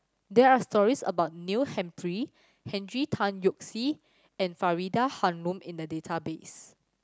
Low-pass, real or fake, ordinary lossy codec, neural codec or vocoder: none; real; none; none